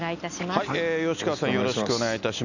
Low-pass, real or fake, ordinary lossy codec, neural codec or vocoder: 7.2 kHz; real; none; none